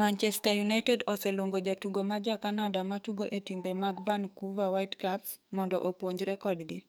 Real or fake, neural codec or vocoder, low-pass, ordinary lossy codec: fake; codec, 44.1 kHz, 2.6 kbps, SNAC; none; none